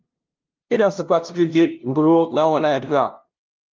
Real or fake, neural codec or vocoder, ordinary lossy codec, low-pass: fake; codec, 16 kHz, 0.5 kbps, FunCodec, trained on LibriTTS, 25 frames a second; Opus, 32 kbps; 7.2 kHz